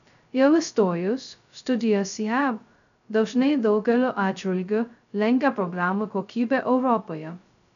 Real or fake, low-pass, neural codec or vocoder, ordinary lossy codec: fake; 7.2 kHz; codec, 16 kHz, 0.2 kbps, FocalCodec; MP3, 96 kbps